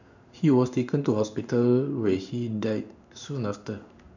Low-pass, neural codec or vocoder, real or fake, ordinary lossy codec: 7.2 kHz; codec, 16 kHz in and 24 kHz out, 1 kbps, XY-Tokenizer; fake; none